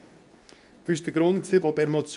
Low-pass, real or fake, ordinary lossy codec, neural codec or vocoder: 10.8 kHz; fake; none; codec, 24 kHz, 0.9 kbps, WavTokenizer, medium speech release version 2